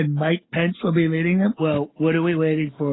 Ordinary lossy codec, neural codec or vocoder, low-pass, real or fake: AAC, 16 kbps; none; 7.2 kHz; real